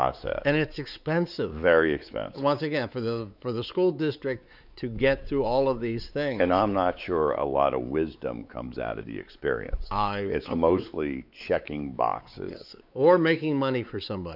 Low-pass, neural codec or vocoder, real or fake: 5.4 kHz; codec, 16 kHz, 4 kbps, X-Codec, WavLM features, trained on Multilingual LibriSpeech; fake